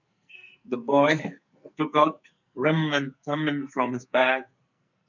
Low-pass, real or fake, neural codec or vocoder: 7.2 kHz; fake; codec, 44.1 kHz, 2.6 kbps, SNAC